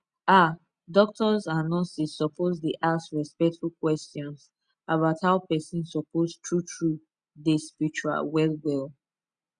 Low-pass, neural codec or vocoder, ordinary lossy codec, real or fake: 9.9 kHz; none; Opus, 64 kbps; real